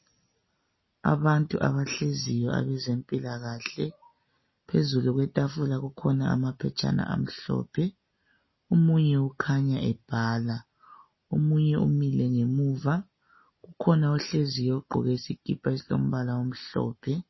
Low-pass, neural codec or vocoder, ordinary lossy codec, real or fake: 7.2 kHz; none; MP3, 24 kbps; real